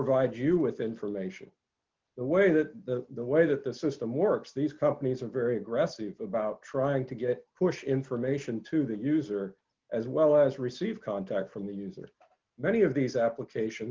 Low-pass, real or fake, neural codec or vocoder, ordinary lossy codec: 7.2 kHz; real; none; Opus, 32 kbps